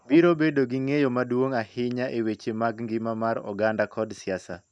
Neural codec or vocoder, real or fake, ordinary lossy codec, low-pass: none; real; none; 9.9 kHz